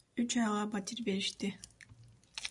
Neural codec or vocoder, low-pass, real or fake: none; 10.8 kHz; real